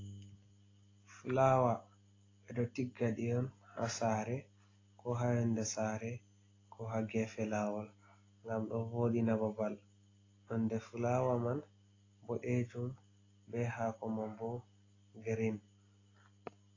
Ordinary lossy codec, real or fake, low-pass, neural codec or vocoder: AAC, 32 kbps; real; 7.2 kHz; none